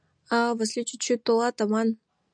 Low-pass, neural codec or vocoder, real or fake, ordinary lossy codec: 9.9 kHz; none; real; MP3, 96 kbps